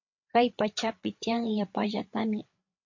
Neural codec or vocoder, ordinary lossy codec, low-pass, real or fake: codec, 16 kHz, 8 kbps, FreqCodec, larger model; MP3, 48 kbps; 7.2 kHz; fake